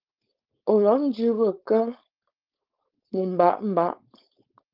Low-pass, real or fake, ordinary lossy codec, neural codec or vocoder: 5.4 kHz; fake; Opus, 32 kbps; codec, 16 kHz, 4.8 kbps, FACodec